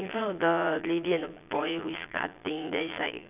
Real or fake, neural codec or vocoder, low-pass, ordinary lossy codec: fake; vocoder, 22.05 kHz, 80 mel bands, Vocos; 3.6 kHz; none